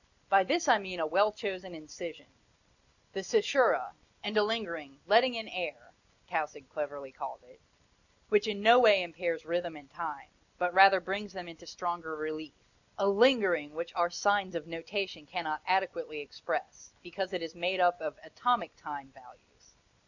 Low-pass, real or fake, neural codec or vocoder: 7.2 kHz; real; none